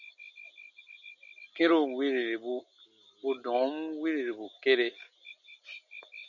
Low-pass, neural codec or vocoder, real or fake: 7.2 kHz; none; real